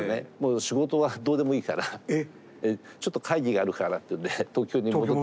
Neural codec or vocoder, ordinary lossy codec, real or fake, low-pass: none; none; real; none